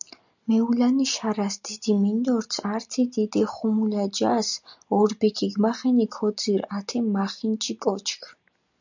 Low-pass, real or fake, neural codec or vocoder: 7.2 kHz; real; none